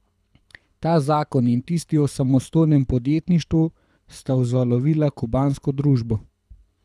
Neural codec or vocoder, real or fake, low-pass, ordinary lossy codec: codec, 24 kHz, 6 kbps, HILCodec; fake; none; none